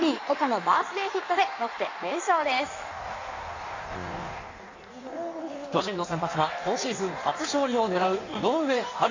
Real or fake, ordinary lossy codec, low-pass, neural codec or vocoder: fake; AAC, 48 kbps; 7.2 kHz; codec, 16 kHz in and 24 kHz out, 1.1 kbps, FireRedTTS-2 codec